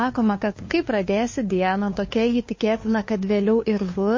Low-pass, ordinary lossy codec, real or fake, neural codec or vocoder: 7.2 kHz; MP3, 32 kbps; fake; codec, 16 kHz, 2 kbps, FunCodec, trained on LibriTTS, 25 frames a second